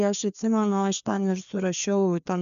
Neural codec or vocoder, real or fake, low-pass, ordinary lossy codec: codec, 16 kHz, 2 kbps, FreqCodec, larger model; fake; 7.2 kHz; MP3, 96 kbps